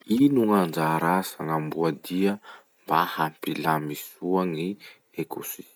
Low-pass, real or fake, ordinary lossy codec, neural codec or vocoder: none; real; none; none